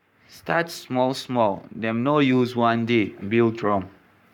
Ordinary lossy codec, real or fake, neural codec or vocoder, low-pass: none; fake; codec, 44.1 kHz, 7.8 kbps, Pupu-Codec; 19.8 kHz